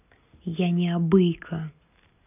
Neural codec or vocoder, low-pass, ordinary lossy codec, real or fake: none; 3.6 kHz; none; real